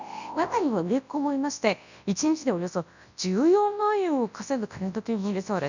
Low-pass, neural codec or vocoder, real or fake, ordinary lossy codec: 7.2 kHz; codec, 24 kHz, 0.9 kbps, WavTokenizer, large speech release; fake; none